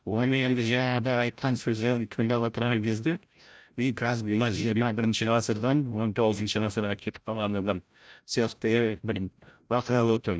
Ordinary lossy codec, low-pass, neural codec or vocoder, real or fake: none; none; codec, 16 kHz, 0.5 kbps, FreqCodec, larger model; fake